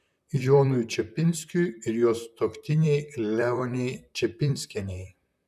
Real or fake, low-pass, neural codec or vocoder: fake; 14.4 kHz; vocoder, 44.1 kHz, 128 mel bands, Pupu-Vocoder